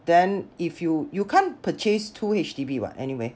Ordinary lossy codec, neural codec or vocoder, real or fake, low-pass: none; none; real; none